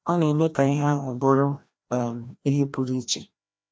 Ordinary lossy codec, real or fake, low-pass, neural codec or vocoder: none; fake; none; codec, 16 kHz, 1 kbps, FreqCodec, larger model